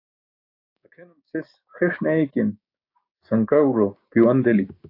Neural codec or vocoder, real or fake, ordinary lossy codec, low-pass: codec, 16 kHz, 6 kbps, DAC; fake; AAC, 32 kbps; 5.4 kHz